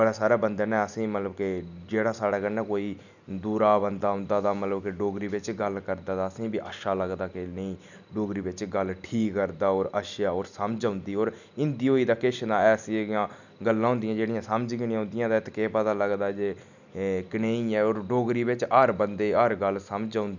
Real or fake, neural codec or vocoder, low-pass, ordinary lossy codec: real; none; 7.2 kHz; none